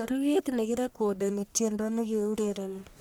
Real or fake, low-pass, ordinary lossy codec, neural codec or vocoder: fake; none; none; codec, 44.1 kHz, 1.7 kbps, Pupu-Codec